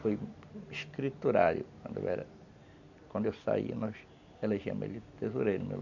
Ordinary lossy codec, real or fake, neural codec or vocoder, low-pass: none; real; none; 7.2 kHz